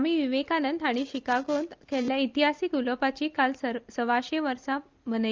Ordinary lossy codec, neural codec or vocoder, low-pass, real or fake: Opus, 24 kbps; none; 7.2 kHz; real